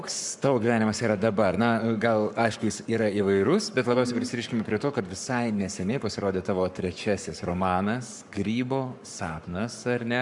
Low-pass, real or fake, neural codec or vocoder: 10.8 kHz; fake; codec, 44.1 kHz, 7.8 kbps, Pupu-Codec